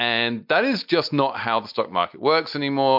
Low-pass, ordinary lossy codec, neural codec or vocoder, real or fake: 5.4 kHz; MP3, 48 kbps; none; real